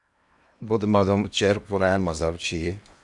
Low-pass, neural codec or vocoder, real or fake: 10.8 kHz; codec, 16 kHz in and 24 kHz out, 0.8 kbps, FocalCodec, streaming, 65536 codes; fake